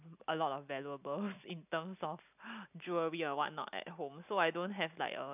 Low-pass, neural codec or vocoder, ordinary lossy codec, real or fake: 3.6 kHz; none; none; real